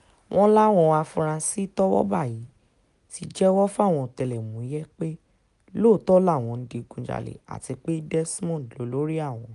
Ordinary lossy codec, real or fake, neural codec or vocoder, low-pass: none; real; none; 10.8 kHz